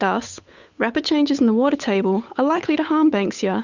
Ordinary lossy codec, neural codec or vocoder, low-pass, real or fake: Opus, 64 kbps; codec, 16 kHz, 8 kbps, FunCodec, trained on Chinese and English, 25 frames a second; 7.2 kHz; fake